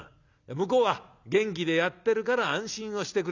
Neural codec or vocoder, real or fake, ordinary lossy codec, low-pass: none; real; none; 7.2 kHz